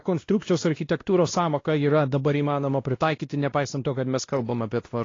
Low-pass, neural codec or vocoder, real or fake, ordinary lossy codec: 7.2 kHz; codec, 16 kHz, 1 kbps, X-Codec, WavLM features, trained on Multilingual LibriSpeech; fake; AAC, 32 kbps